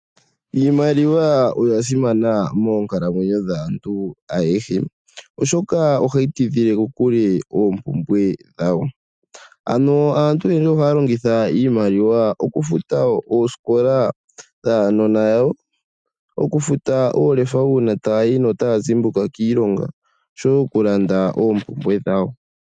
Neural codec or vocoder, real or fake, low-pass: none; real; 9.9 kHz